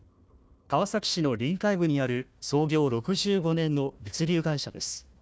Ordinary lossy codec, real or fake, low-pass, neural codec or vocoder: none; fake; none; codec, 16 kHz, 1 kbps, FunCodec, trained on Chinese and English, 50 frames a second